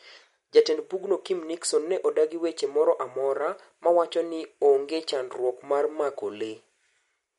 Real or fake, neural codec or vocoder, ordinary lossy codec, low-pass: real; none; MP3, 48 kbps; 19.8 kHz